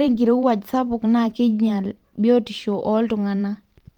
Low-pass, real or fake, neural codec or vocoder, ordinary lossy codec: 19.8 kHz; fake; vocoder, 44.1 kHz, 128 mel bands every 512 samples, BigVGAN v2; Opus, 24 kbps